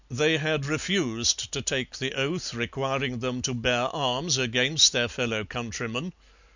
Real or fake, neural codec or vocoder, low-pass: real; none; 7.2 kHz